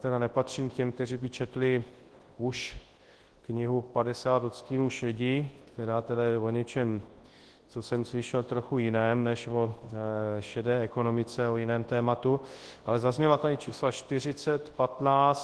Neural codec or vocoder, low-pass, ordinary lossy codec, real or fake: codec, 24 kHz, 0.9 kbps, WavTokenizer, large speech release; 10.8 kHz; Opus, 16 kbps; fake